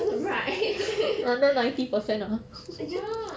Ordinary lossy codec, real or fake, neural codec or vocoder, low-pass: none; real; none; none